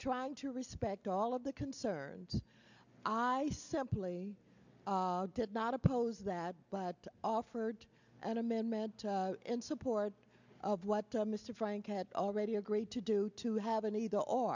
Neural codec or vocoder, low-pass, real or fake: none; 7.2 kHz; real